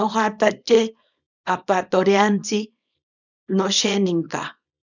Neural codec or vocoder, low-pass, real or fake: codec, 24 kHz, 0.9 kbps, WavTokenizer, small release; 7.2 kHz; fake